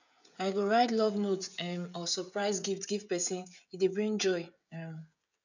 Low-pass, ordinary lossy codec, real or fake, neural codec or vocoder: 7.2 kHz; none; fake; codec, 16 kHz, 16 kbps, FreqCodec, smaller model